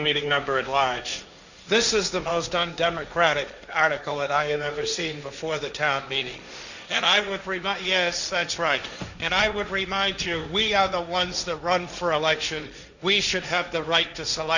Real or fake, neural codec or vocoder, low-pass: fake; codec, 16 kHz, 1.1 kbps, Voila-Tokenizer; 7.2 kHz